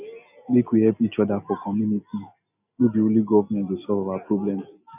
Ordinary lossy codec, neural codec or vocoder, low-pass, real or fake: none; none; 3.6 kHz; real